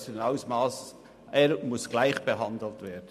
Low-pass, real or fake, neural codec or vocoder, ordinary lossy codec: 14.4 kHz; real; none; MP3, 64 kbps